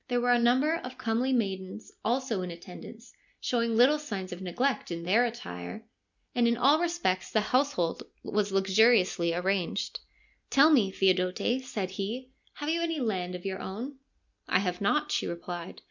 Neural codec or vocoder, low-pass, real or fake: none; 7.2 kHz; real